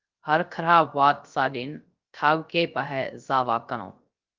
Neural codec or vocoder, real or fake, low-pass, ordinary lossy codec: codec, 16 kHz, 0.3 kbps, FocalCodec; fake; 7.2 kHz; Opus, 24 kbps